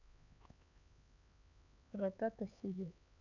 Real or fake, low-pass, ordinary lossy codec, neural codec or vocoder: fake; 7.2 kHz; none; codec, 16 kHz, 4 kbps, X-Codec, HuBERT features, trained on LibriSpeech